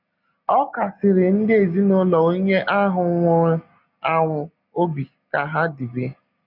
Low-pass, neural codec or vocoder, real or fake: 5.4 kHz; none; real